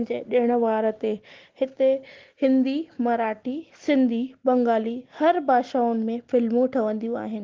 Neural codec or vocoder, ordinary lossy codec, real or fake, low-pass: none; Opus, 16 kbps; real; 7.2 kHz